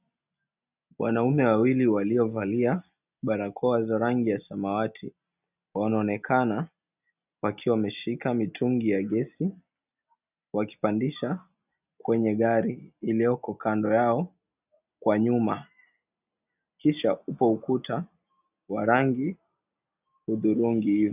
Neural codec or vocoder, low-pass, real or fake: none; 3.6 kHz; real